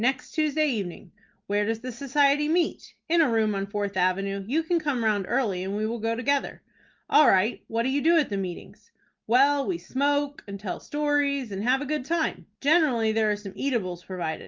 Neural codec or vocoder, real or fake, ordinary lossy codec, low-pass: none; real; Opus, 24 kbps; 7.2 kHz